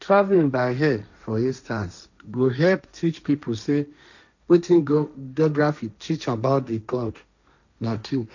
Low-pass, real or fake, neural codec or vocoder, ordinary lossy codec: 7.2 kHz; fake; codec, 16 kHz, 1.1 kbps, Voila-Tokenizer; none